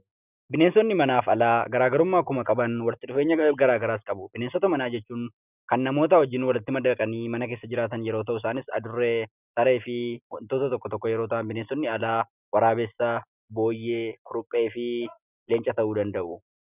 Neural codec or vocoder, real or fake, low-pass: none; real; 3.6 kHz